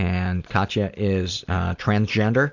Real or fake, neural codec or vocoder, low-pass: real; none; 7.2 kHz